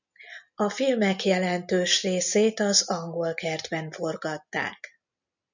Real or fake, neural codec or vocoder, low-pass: real; none; 7.2 kHz